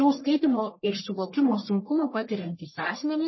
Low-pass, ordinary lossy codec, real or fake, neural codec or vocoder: 7.2 kHz; MP3, 24 kbps; fake; codec, 44.1 kHz, 1.7 kbps, Pupu-Codec